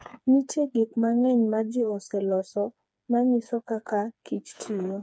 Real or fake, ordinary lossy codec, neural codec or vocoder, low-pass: fake; none; codec, 16 kHz, 4 kbps, FreqCodec, smaller model; none